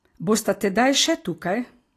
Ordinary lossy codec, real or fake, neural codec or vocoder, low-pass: AAC, 48 kbps; real; none; 14.4 kHz